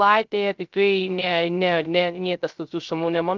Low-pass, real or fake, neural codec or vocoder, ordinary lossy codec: 7.2 kHz; fake; codec, 16 kHz, 0.3 kbps, FocalCodec; Opus, 24 kbps